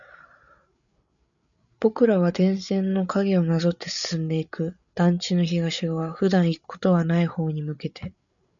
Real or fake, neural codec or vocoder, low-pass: fake; codec, 16 kHz, 8 kbps, FreqCodec, larger model; 7.2 kHz